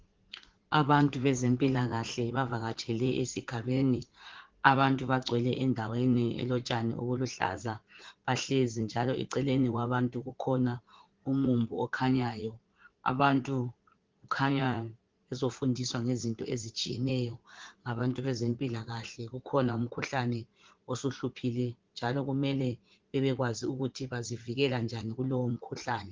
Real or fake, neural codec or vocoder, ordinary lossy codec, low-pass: fake; vocoder, 44.1 kHz, 80 mel bands, Vocos; Opus, 16 kbps; 7.2 kHz